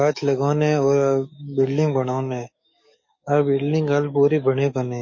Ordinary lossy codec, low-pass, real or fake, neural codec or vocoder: MP3, 32 kbps; 7.2 kHz; fake; codec, 44.1 kHz, 7.8 kbps, DAC